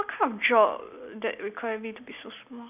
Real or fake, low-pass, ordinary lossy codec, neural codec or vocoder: real; 3.6 kHz; none; none